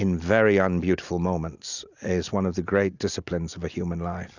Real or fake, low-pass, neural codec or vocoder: real; 7.2 kHz; none